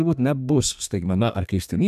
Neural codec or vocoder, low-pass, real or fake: codec, 32 kHz, 1.9 kbps, SNAC; 14.4 kHz; fake